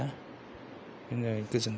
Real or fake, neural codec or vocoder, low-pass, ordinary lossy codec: real; none; none; none